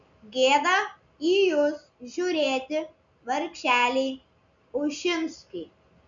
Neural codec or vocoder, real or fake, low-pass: none; real; 7.2 kHz